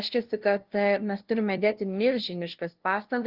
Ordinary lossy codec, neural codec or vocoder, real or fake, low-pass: Opus, 16 kbps; codec, 16 kHz, 0.5 kbps, FunCodec, trained on LibriTTS, 25 frames a second; fake; 5.4 kHz